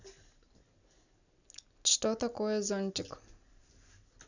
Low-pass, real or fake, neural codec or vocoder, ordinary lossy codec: 7.2 kHz; real; none; none